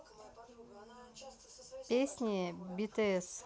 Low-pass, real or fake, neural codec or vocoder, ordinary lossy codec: none; real; none; none